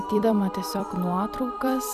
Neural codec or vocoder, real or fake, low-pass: vocoder, 44.1 kHz, 128 mel bands every 256 samples, BigVGAN v2; fake; 14.4 kHz